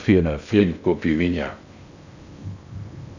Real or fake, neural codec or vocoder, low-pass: fake; codec, 16 kHz in and 24 kHz out, 0.6 kbps, FocalCodec, streaming, 2048 codes; 7.2 kHz